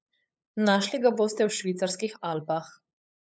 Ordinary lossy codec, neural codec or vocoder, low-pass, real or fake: none; codec, 16 kHz, 8 kbps, FunCodec, trained on LibriTTS, 25 frames a second; none; fake